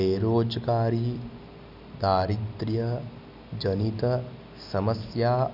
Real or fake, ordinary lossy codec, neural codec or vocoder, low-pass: real; none; none; 5.4 kHz